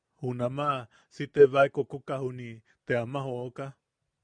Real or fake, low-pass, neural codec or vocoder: real; 9.9 kHz; none